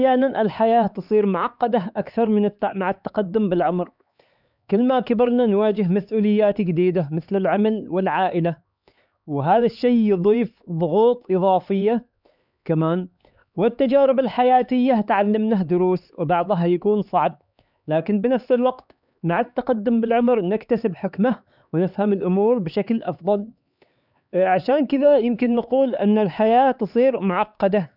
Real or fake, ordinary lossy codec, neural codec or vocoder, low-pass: fake; none; codec, 16 kHz, 4 kbps, X-Codec, HuBERT features, trained on LibriSpeech; 5.4 kHz